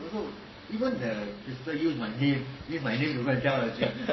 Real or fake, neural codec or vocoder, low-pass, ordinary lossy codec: fake; codec, 44.1 kHz, 7.8 kbps, Pupu-Codec; 7.2 kHz; MP3, 24 kbps